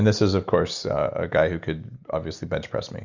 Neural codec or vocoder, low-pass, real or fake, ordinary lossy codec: none; 7.2 kHz; real; Opus, 64 kbps